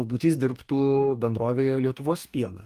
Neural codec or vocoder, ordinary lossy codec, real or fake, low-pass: codec, 44.1 kHz, 2.6 kbps, DAC; Opus, 32 kbps; fake; 14.4 kHz